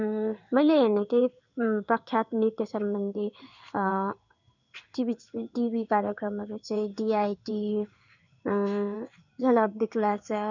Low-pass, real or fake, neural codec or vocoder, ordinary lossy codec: 7.2 kHz; fake; codec, 16 kHz in and 24 kHz out, 1 kbps, XY-Tokenizer; none